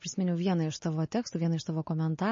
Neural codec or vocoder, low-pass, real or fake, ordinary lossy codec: none; 7.2 kHz; real; MP3, 32 kbps